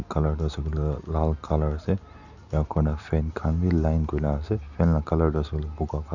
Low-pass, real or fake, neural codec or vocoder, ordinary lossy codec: 7.2 kHz; real; none; none